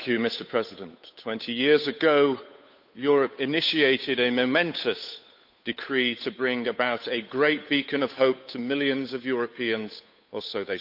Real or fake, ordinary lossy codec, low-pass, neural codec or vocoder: fake; none; 5.4 kHz; codec, 16 kHz, 8 kbps, FunCodec, trained on Chinese and English, 25 frames a second